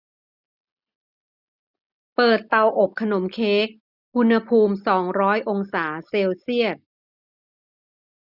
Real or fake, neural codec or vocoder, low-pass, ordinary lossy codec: real; none; 5.4 kHz; none